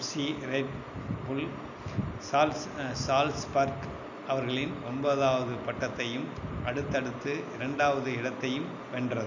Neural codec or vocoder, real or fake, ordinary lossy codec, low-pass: none; real; none; 7.2 kHz